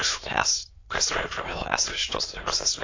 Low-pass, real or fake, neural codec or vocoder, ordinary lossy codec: 7.2 kHz; fake; autoencoder, 22.05 kHz, a latent of 192 numbers a frame, VITS, trained on many speakers; AAC, 32 kbps